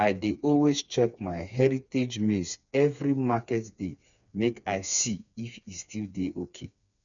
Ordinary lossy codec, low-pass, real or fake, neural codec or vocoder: none; 7.2 kHz; fake; codec, 16 kHz, 4 kbps, FreqCodec, smaller model